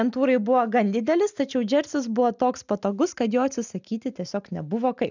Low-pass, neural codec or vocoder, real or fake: 7.2 kHz; none; real